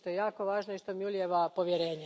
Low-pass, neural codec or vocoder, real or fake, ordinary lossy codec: none; none; real; none